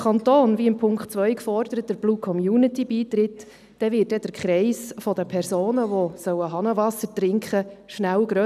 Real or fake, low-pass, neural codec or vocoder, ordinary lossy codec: real; 14.4 kHz; none; none